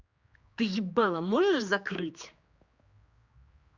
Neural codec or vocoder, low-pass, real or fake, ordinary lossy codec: codec, 16 kHz, 2 kbps, X-Codec, HuBERT features, trained on general audio; 7.2 kHz; fake; Opus, 64 kbps